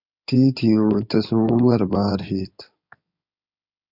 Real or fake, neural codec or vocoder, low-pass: fake; codec, 16 kHz in and 24 kHz out, 2.2 kbps, FireRedTTS-2 codec; 5.4 kHz